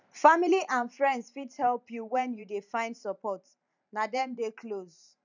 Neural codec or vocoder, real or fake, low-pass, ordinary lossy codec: vocoder, 44.1 kHz, 128 mel bands every 512 samples, BigVGAN v2; fake; 7.2 kHz; none